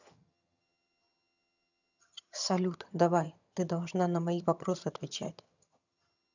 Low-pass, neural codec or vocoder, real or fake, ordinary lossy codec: 7.2 kHz; vocoder, 22.05 kHz, 80 mel bands, HiFi-GAN; fake; none